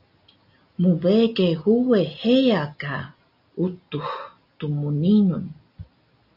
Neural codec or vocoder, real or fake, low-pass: none; real; 5.4 kHz